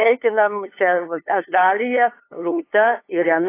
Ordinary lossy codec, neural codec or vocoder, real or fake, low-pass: AAC, 24 kbps; codec, 16 kHz, 2 kbps, FunCodec, trained on LibriTTS, 25 frames a second; fake; 3.6 kHz